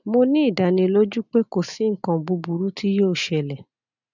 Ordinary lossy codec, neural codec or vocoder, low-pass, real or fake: none; none; 7.2 kHz; real